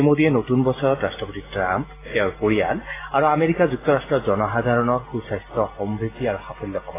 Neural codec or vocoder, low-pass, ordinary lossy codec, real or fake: none; 3.6 kHz; AAC, 16 kbps; real